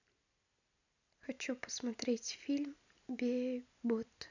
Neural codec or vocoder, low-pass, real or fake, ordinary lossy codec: none; 7.2 kHz; real; none